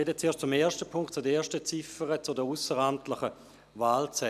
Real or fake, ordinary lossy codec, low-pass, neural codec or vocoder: real; none; 14.4 kHz; none